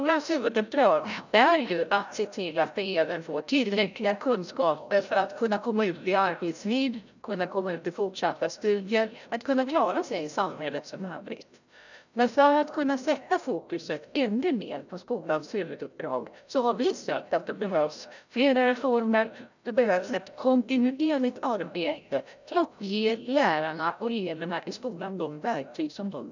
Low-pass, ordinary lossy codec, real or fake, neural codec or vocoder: 7.2 kHz; none; fake; codec, 16 kHz, 0.5 kbps, FreqCodec, larger model